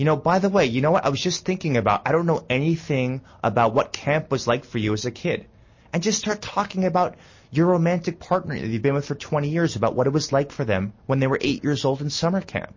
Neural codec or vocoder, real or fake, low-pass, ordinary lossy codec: none; real; 7.2 kHz; MP3, 32 kbps